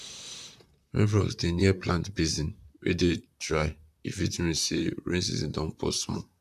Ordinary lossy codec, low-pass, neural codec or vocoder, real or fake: none; 14.4 kHz; vocoder, 44.1 kHz, 128 mel bands, Pupu-Vocoder; fake